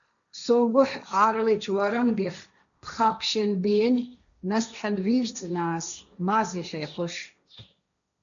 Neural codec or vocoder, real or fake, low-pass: codec, 16 kHz, 1.1 kbps, Voila-Tokenizer; fake; 7.2 kHz